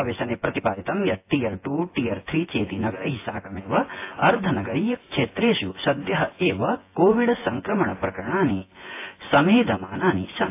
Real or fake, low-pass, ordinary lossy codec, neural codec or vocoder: fake; 3.6 kHz; AAC, 24 kbps; vocoder, 24 kHz, 100 mel bands, Vocos